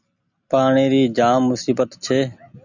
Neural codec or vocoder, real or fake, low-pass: none; real; 7.2 kHz